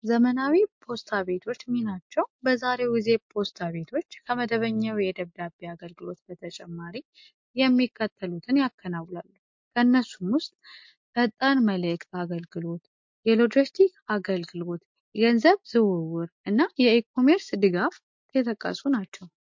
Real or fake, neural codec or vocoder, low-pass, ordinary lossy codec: real; none; 7.2 kHz; MP3, 48 kbps